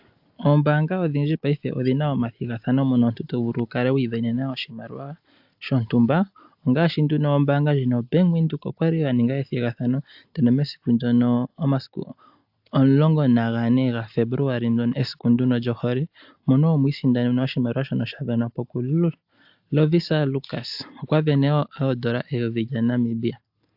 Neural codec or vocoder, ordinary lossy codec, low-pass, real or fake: none; AAC, 48 kbps; 5.4 kHz; real